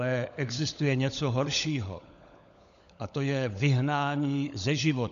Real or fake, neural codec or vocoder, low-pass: fake; codec, 16 kHz, 16 kbps, FunCodec, trained on LibriTTS, 50 frames a second; 7.2 kHz